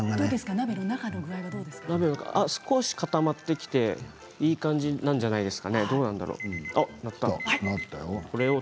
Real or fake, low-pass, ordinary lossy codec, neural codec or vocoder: real; none; none; none